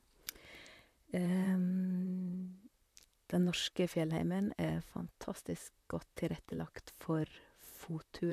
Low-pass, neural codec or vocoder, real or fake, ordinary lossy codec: 14.4 kHz; vocoder, 44.1 kHz, 128 mel bands, Pupu-Vocoder; fake; AAC, 96 kbps